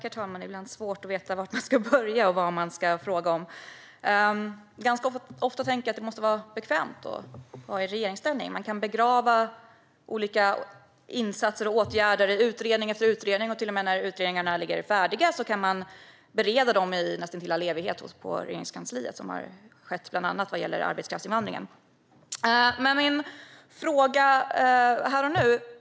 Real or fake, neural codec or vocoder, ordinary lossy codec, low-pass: real; none; none; none